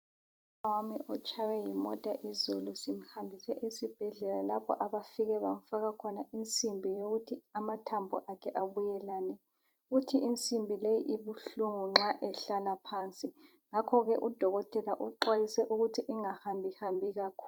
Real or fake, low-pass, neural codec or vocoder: fake; 19.8 kHz; vocoder, 44.1 kHz, 128 mel bands every 256 samples, BigVGAN v2